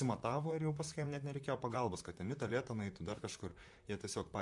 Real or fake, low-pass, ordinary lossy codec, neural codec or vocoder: fake; 10.8 kHz; MP3, 64 kbps; vocoder, 44.1 kHz, 128 mel bands, Pupu-Vocoder